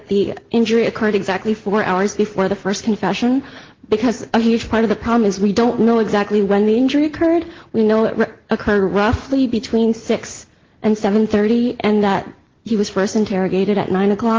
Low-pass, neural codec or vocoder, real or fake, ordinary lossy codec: 7.2 kHz; none; real; Opus, 16 kbps